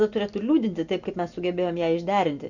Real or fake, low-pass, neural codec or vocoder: real; 7.2 kHz; none